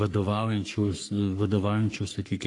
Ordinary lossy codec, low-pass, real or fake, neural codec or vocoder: AAC, 48 kbps; 10.8 kHz; fake; codec, 44.1 kHz, 3.4 kbps, Pupu-Codec